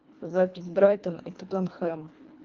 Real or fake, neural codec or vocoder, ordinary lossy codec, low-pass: fake; codec, 24 kHz, 1.5 kbps, HILCodec; Opus, 24 kbps; 7.2 kHz